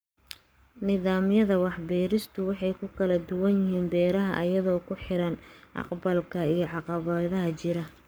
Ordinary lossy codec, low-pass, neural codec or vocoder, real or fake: none; none; codec, 44.1 kHz, 7.8 kbps, Pupu-Codec; fake